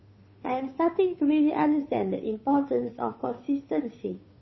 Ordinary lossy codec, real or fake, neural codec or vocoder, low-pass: MP3, 24 kbps; fake; codec, 16 kHz, 2 kbps, FunCodec, trained on Chinese and English, 25 frames a second; 7.2 kHz